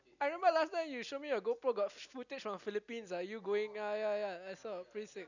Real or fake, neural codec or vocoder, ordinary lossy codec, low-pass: real; none; none; 7.2 kHz